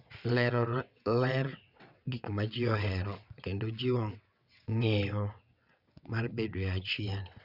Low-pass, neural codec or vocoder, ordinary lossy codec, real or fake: 5.4 kHz; vocoder, 22.05 kHz, 80 mel bands, WaveNeXt; none; fake